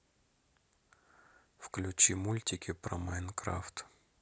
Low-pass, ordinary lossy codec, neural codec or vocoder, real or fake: none; none; none; real